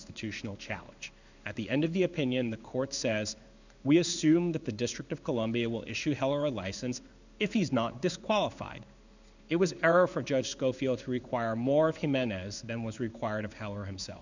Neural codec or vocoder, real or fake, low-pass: codec, 16 kHz in and 24 kHz out, 1 kbps, XY-Tokenizer; fake; 7.2 kHz